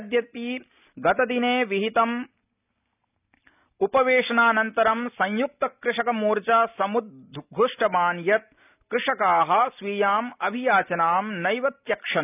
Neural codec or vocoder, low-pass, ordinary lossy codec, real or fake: none; 3.6 kHz; none; real